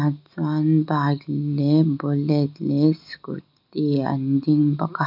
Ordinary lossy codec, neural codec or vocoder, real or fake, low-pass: none; none; real; 5.4 kHz